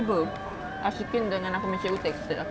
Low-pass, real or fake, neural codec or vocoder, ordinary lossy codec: none; real; none; none